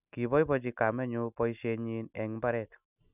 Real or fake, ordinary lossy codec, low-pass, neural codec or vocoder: real; none; 3.6 kHz; none